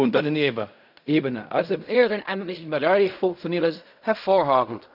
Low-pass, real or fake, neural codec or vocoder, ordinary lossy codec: 5.4 kHz; fake; codec, 16 kHz in and 24 kHz out, 0.4 kbps, LongCat-Audio-Codec, fine tuned four codebook decoder; none